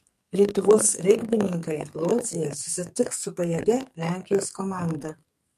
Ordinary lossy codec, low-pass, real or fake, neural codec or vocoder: MP3, 64 kbps; 14.4 kHz; fake; codec, 44.1 kHz, 2.6 kbps, SNAC